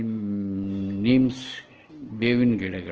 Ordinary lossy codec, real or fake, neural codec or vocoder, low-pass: Opus, 16 kbps; real; none; 7.2 kHz